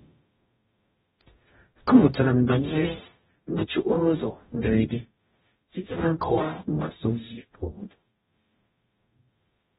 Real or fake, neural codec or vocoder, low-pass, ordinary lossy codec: fake; codec, 44.1 kHz, 0.9 kbps, DAC; 19.8 kHz; AAC, 16 kbps